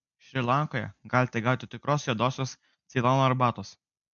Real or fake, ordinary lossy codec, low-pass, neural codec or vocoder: real; MP3, 64 kbps; 7.2 kHz; none